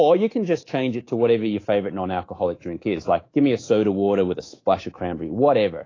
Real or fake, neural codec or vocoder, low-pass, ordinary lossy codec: fake; codec, 24 kHz, 3.1 kbps, DualCodec; 7.2 kHz; AAC, 32 kbps